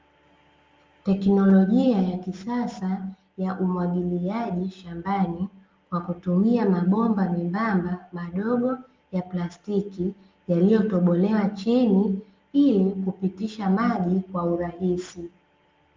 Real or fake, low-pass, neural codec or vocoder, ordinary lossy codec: real; 7.2 kHz; none; Opus, 32 kbps